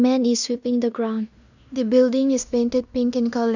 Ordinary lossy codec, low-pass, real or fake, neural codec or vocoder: none; 7.2 kHz; fake; codec, 16 kHz in and 24 kHz out, 0.9 kbps, LongCat-Audio-Codec, fine tuned four codebook decoder